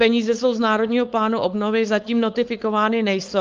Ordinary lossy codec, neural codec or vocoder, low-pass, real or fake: Opus, 32 kbps; codec, 16 kHz, 4.8 kbps, FACodec; 7.2 kHz; fake